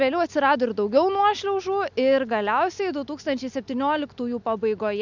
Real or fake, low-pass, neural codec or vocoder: real; 7.2 kHz; none